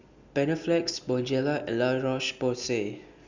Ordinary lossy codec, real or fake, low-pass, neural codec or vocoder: Opus, 64 kbps; real; 7.2 kHz; none